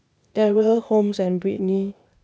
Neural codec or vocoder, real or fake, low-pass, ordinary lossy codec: codec, 16 kHz, 0.8 kbps, ZipCodec; fake; none; none